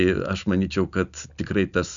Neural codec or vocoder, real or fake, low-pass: none; real; 7.2 kHz